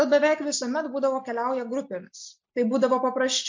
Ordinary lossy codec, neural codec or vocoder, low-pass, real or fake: MP3, 48 kbps; none; 7.2 kHz; real